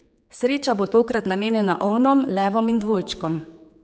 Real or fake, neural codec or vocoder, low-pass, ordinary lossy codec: fake; codec, 16 kHz, 4 kbps, X-Codec, HuBERT features, trained on general audio; none; none